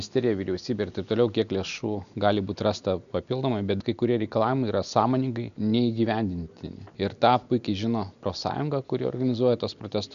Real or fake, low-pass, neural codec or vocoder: real; 7.2 kHz; none